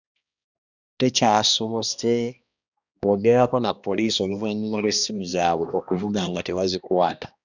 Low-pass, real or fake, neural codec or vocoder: 7.2 kHz; fake; codec, 16 kHz, 1 kbps, X-Codec, HuBERT features, trained on balanced general audio